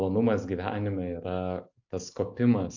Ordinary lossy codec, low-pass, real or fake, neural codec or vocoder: AAC, 48 kbps; 7.2 kHz; real; none